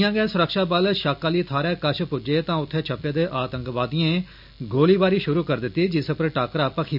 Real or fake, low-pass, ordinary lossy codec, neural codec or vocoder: real; 5.4 kHz; none; none